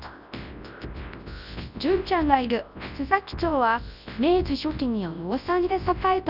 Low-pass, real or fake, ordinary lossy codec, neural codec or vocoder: 5.4 kHz; fake; none; codec, 24 kHz, 0.9 kbps, WavTokenizer, large speech release